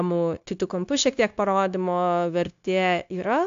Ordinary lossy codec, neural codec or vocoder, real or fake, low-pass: AAC, 64 kbps; codec, 16 kHz, 0.9 kbps, LongCat-Audio-Codec; fake; 7.2 kHz